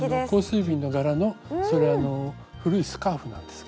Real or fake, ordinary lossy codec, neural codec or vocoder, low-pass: real; none; none; none